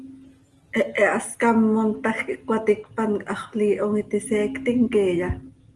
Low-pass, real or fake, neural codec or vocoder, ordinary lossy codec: 10.8 kHz; real; none; Opus, 24 kbps